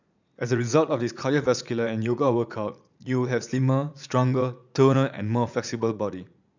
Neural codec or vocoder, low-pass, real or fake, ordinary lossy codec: vocoder, 44.1 kHz, 80 mel bands, Vocos; 7.2 kHz; fake; none